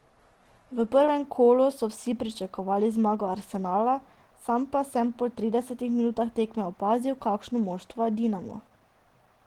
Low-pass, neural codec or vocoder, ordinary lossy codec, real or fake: 19.8 kHz; none; Opus, 16 kbps; real